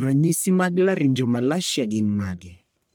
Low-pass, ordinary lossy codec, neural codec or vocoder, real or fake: none; none; codec, 44.1 kHz, 1.7 kbps, Pupu-Codec; fake